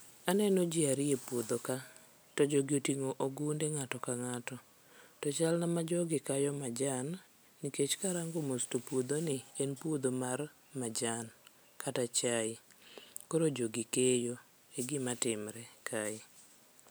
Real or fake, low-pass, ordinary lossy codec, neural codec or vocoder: real; none; none; none